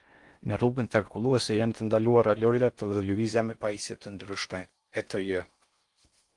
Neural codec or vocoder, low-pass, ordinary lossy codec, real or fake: codec, 16 kHz in and 24 kHz out, 0.6 kbps, FocalCodec, streaming, 4096 codes; 10.8 kHz; Opus, 32 kbps; fake